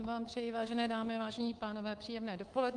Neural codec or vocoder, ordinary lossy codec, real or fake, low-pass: codec, 24 kHz, 3.1 kbps, DualCodec; Opus, 24 kbps; fake; 10.8 kHz